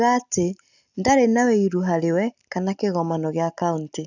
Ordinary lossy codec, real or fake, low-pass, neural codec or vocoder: none; real; 7.2 kHz; none